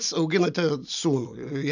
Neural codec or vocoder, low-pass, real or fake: none; 7.2 kHz; real